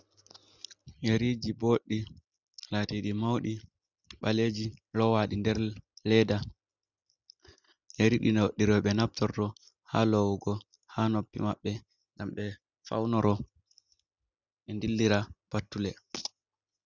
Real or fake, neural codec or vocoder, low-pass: real; none; 7.2 kHz